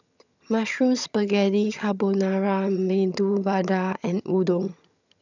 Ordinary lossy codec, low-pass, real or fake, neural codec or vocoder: none; 7.2 kHz; fake; vocoder, 22.05 kHz, 80 mel bands, HiFi-GAN